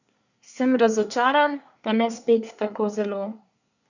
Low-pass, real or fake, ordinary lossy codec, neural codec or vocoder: 7.2 kHz; fake; none; codec, 24 kHz, 1 kbps, SNAC